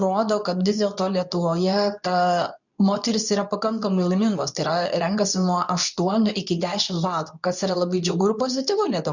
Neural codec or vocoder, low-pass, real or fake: codec, 24 kHz, 0.9 kbps, WavTokenizer, medium speech release version 2; 7.2 kHz; fake